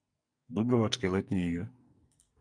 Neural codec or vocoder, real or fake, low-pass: codec, 44.1 kHz, 2.6 kbps, SNAC; fake; 9.9 kHz